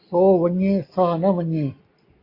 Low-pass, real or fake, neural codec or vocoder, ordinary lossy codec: 5.4 kHz; real; none; AAC, 32 kbps